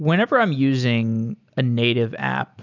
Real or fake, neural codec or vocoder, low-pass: real; none; 7.2 kHz